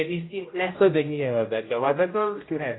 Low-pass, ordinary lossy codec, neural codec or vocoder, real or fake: 7.2 kHz; AAC, 16 kbps; codec, 16 kHz, 0.5 kbps, X-Codec, HuBERT features, trained on general audio; fake